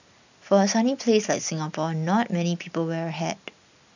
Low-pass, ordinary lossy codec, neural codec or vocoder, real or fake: 7.2 kHz; none; none; real